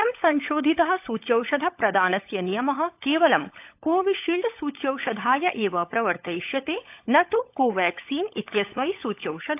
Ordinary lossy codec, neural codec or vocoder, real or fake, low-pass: AAC, 32 kbps; codec, 16 kHz, 16 kbps, FunCodec, trained on LibriTTS, 50 frames a second; fake; 3.6 kHz